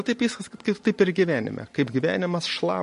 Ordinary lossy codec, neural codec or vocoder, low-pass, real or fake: MP3, 48 kbps; none; 14.4 kHz; real